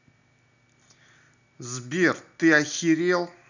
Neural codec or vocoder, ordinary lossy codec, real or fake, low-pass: none; none; real; 7.2 kHz